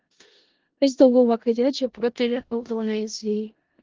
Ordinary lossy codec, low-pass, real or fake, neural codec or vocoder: Opus, 16 kbps; 7.2 kHz; fake; codec, 16 kHz in and 24 kHz out, 0.4 kbps, LongCat-Audio-Codec, four codebook decoder